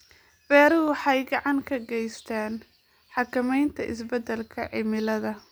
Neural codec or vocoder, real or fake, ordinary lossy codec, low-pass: none; real; none; none